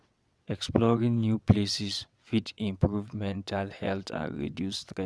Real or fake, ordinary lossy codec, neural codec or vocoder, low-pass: fake; none; vocoder, 22.05 kHz, 80 mel bands, WaveNeXt; none